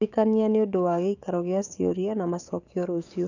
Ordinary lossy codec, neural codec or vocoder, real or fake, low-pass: none; codec, 44.1 kHz, 7.8 kbps, DAC; fake; 7.2 kHz